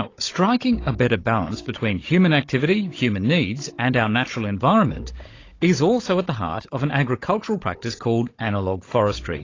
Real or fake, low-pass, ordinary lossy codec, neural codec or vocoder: fake; 7.2 kHz; AAC, 32 kbps; codec, 16 kHz, 16 kbps, FunCodec, trained on Chinese and English, 50 frames a second